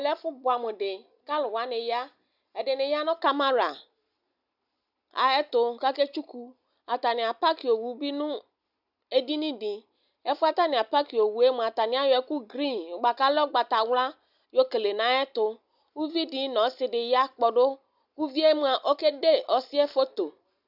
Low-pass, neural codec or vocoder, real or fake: 5.4 kHz; none; real